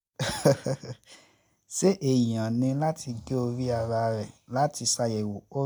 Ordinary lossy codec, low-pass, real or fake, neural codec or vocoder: none; none; real; none